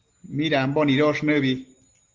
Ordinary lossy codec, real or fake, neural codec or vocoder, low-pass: Opus, 16 kbps; real; none; 7.2 kHz